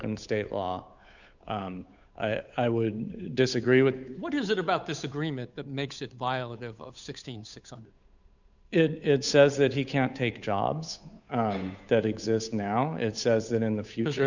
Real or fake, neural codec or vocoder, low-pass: fake; codec, 16 kHz, 8 kbps, FunCodec, trained on Chinese and English, 25 frames a second; 7.2 kHz